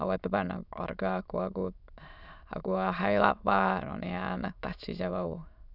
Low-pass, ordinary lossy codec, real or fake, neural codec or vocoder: 5.4 kHz; none; fake; autoencoder, 22.05 kHz, a latent of 192 numbers a frame, VITS, trained on many speakers